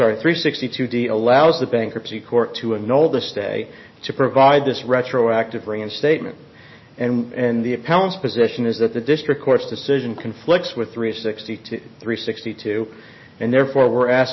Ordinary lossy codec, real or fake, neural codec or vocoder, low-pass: MP3, 24 kbps; real; none; 7.2 kHz